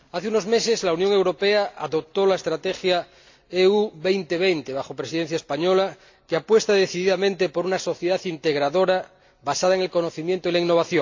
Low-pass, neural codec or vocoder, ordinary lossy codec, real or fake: 7.2 kHz; none; AAC, 48 kbps; real